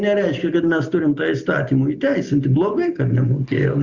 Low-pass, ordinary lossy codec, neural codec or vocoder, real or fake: 7.2 kHz; Opus, 64 kbps; none; real